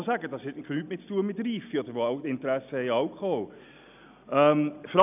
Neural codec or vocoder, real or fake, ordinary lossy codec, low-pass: none; real; none; 3.6 kHz